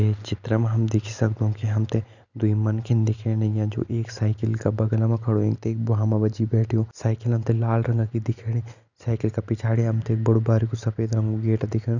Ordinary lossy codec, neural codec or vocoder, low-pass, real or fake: none; none; 7.2 kHz; real